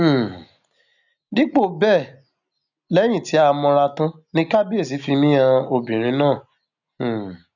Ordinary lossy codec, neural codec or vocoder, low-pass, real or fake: none; none; 7.2 kHz; real